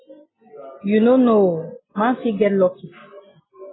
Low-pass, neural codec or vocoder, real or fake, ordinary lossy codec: 7.2 kHz; none; real; AAC, 16 kbps